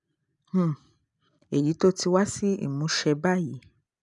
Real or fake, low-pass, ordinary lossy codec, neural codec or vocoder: real; 10.8 kHz; none; none